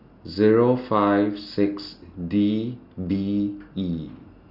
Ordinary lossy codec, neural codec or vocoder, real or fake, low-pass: none; none; real; 5.4 kHz